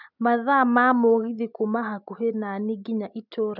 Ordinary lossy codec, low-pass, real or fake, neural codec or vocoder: none; 5.4 kHz; real; none